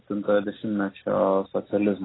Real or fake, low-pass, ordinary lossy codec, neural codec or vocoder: real; 7.2 kHz; AAC, 16 kbps; none